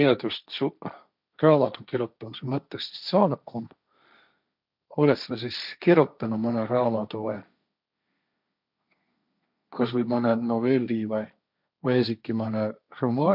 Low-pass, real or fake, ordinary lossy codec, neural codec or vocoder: 5.4 kHz; fake; none; codec, 16 kHz, 1.1 kbps, Voila-Tokenizer